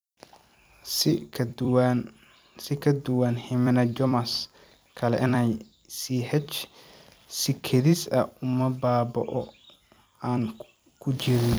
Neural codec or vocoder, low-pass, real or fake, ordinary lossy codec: vocoder, 44.1 kHz, 128 mel bands every 256 samples, BigVGAN v2; none; fake; none